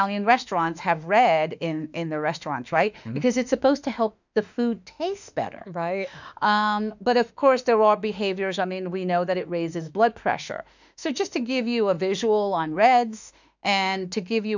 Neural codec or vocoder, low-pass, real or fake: autoencoder, 48 kHz, 32 numbers a frame, DAC-VAE, trained on Japanese speech; 7.2 kHz; fake